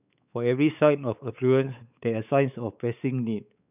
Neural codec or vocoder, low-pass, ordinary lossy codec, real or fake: codec, 16 kHz, 4 kbps, X-Codec, WavLM features, trained on Multilingual LibriSpeech; 3.6 kHz; none; fake